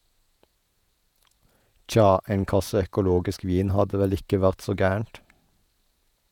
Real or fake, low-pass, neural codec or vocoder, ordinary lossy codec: fake; 19.8 kHz; vocoder, 48 kHz, 128 mel bands, Vocos; none